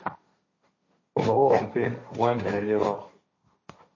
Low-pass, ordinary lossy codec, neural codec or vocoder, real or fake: 7.2 kHz; MP3, 32 kbps; codec, 16 kHz, 1.1 kbps, Voila-Tokenizer; fake